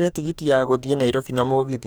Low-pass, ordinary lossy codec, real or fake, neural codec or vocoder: none; none; fake; codec, 44.1 kHz, 2.6 kbps, DAC